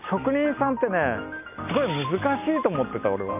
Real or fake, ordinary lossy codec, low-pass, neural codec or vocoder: real; none; 3.6 kHz; none